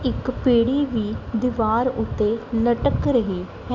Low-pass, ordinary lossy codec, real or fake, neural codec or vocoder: 7.2 kHz; none; real; none